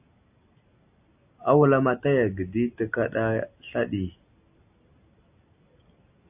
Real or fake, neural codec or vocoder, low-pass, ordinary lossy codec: real; none; 3.6 kHz; AAC, 32 kbps